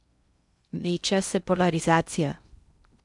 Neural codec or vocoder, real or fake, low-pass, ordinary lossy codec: codec, 16 kHz in and 24 kHz out, 0.6 kbps, FocalCodec, streaming, 2048 codes; fake; 10.8 kHz; MP3, 96 kbps